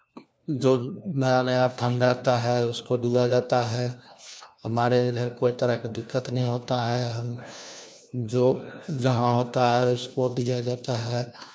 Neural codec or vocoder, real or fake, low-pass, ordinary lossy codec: codec, 16 kHz, 1 kbps, FunCodec, trained on LibriTTS, 50 frames a second; fake; none; none